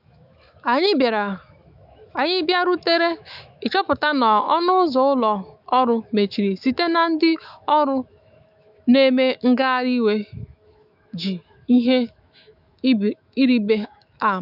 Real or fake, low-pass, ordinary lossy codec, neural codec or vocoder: fake; 5.4 kHz; none; autoencoder, 48 kHz, 128 numbers a frame, DAC-VAE, trained on Japanese speech